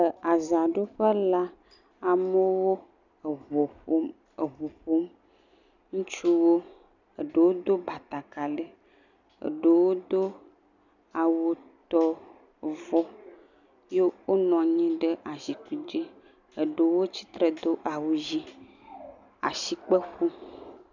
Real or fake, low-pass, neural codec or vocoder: real; 7.2 kHz; none